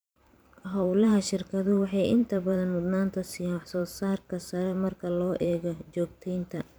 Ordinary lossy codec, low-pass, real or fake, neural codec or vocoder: none; none; fake; vocoder, 44.1 kHz, 128 mel bands every 512 samples, BigVGAN v2